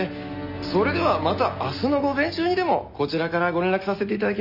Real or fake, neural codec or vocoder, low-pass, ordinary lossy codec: real; none; 5.4 kHz; none